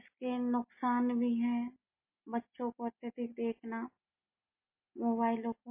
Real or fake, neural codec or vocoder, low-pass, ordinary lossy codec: real; none; 3.6 kHz; MP3, 24 kbps